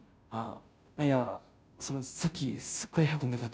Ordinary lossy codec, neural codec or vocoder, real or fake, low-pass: none; codec, 16 kHz, 0.5 kbps, FunCodec, trained on Chinese and English, 25 frames a second; fake; none